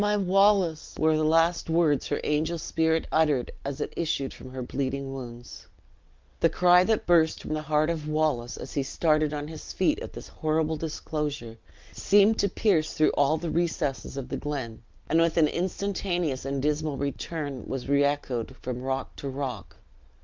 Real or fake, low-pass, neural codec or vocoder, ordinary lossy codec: real; 7.2 kHz; none; Opus, 24 kbps